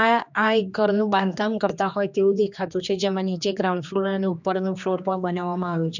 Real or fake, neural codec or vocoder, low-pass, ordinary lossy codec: fake; codec, 16 kHz, 2 kbps, X-Codec, HuBERT features, trained on general audio; 7.2 kHz; none